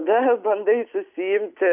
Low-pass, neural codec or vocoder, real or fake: 3.6 kHz; none; real